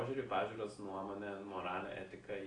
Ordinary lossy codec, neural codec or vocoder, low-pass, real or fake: AAC, 32 kbps; none; 9.9 kHz; real